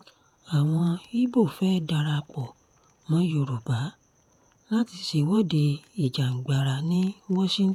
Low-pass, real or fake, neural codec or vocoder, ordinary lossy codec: 19.8 kHz; fake; vocoder, 48 kHz, 128 mel bands, Vocos; none